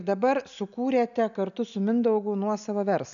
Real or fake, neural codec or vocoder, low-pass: real; none; 7.2 kHz